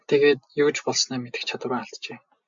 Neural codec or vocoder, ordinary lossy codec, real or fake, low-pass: none; MP3, 64 kbps; real; 7.2 kHz